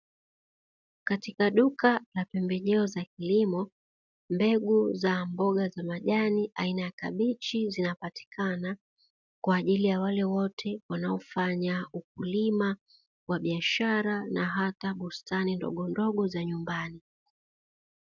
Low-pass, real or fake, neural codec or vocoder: 7.2 kHz; real; none